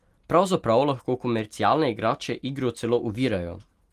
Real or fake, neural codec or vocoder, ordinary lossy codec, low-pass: real; none; Opus, 24 kbps; 14.4 kHz